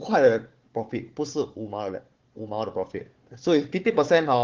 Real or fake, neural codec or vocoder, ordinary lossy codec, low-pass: fake; codec, 16 kHz, 4 kbps, FunCodec, trained on Chinese and English, 50 frames a second; Opus, 16 kbps; 7.2 kHz